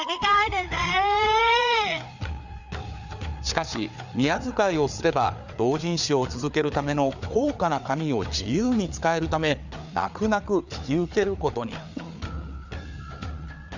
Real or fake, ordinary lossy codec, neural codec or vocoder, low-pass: fake; none; codec, 16 kHz, 4 kbps, FreqCodec, larger model; 7.2 kHz